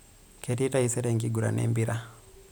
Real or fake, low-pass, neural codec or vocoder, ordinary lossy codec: real; none; none; none